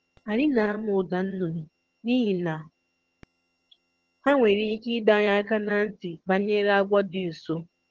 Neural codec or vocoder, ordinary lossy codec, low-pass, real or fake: vocoder, 22.05 kHz, 80 mel bands, HiFi-GAN; Opus, 16 kbps; 7.2 kHz; fake